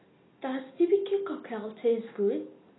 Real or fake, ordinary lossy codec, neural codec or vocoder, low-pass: real; AAC, 16 kbps; none; 7.2 kHz